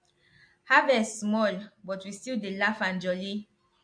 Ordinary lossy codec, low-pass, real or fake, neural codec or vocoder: MP3, 48 kbps; 9.9 kHz; real; none